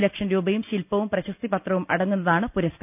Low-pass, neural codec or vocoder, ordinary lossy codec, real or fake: 3.6 kHz; none; none; real